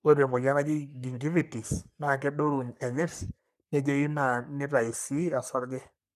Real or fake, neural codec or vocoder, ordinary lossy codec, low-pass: fake; codec, 44.1 kHz, 3.4 kbps, Pupu-Codec; none; 14.4 kHz